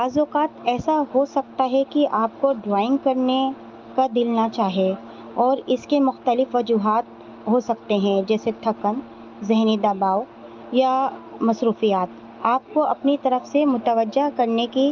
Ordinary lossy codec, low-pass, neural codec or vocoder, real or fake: Opus, 24 kbps; 7.2 kHz; none; real